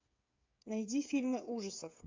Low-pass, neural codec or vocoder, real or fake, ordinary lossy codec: 7.2 kHz; codec, 16 kHz, 8 kbps, FreqCodec, smaller model; fake; MP3, 64 kbps